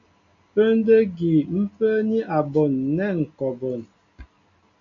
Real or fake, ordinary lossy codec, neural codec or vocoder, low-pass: real; MP3, 96 kbps; none; 7.2 kHz